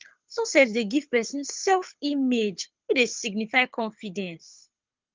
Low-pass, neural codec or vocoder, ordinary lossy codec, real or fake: 7.2 kHz; codec, 24 kHz, 6 kbps, HILCodec; Opus, 24 kbps; fake